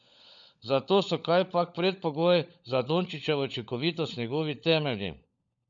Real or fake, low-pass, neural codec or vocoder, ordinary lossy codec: fake; 7.2 kHz; codec, 16 kHz, 16 kbps, FunCodec, trained on LibriTTS, 50 frames a second; none